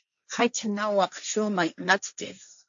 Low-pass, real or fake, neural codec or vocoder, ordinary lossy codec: 7.2 kHz; fake; codec, 16 kHz, 1.1 kbps, Voila-Tokenizer; AAC, 64 kbps